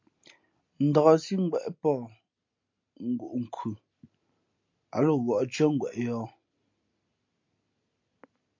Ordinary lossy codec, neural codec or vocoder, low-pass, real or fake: MP3, 48 kbps; none; 7.2 kHz; real